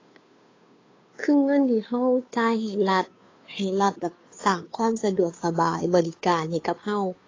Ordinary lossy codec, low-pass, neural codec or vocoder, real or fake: AAC, 32 kbps; 7.2 kHz; codec, 16 kHz, 2 kbps, FunCodec, trained on Chinese and English, 25 frames a second; fake